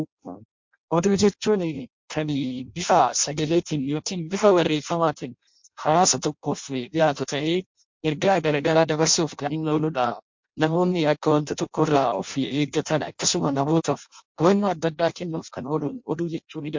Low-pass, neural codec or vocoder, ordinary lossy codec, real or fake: 7.2 kHz; codec, 16 kHz in and 24 kHz out, 0.6 kbps, FireRedTTS-2 codec; MP3, 48 kbps; fake